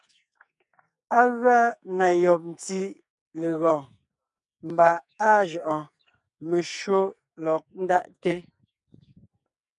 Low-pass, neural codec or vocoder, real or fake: 10.8 kHz; codec, 44.1 kHz, 2.6 kbps, SNAC; fake